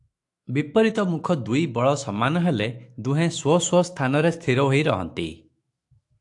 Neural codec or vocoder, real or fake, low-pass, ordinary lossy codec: autoencoder, 48 kHz, 128 numbers a frame, DAC-VAE, trained on Japanese speech; fake; 10.8 kHz; AAC, 64 kbps